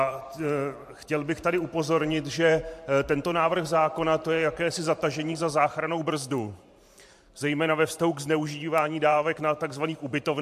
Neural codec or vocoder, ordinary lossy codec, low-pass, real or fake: vocoder, 44.1 kHz, 128 mel bands every 512 samples, BigVGAN v2; MP3, 64 kbps; 14.4 kHz; fake